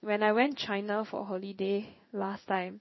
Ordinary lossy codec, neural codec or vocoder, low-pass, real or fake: MP3, 24 kbps; codec, 16 kHz in and 24 kHz out, 1 kbps, XY-Tokenizer; 7.2 kHz; fake